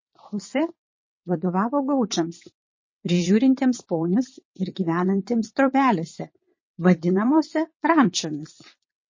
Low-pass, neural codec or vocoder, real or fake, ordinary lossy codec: 7.2 kHz; vocoder, 22.05 kHz, 80 mel bands, WaveNeXt; fake; MP3, 32 kbps